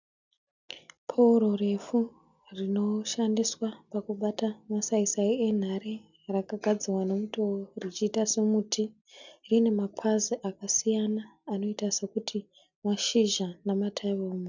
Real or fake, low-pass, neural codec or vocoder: real; 7.2 kHz; none